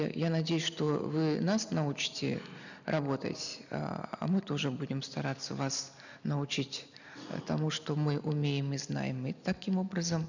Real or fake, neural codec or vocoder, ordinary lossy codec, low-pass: real; none; none; 7.2 kHz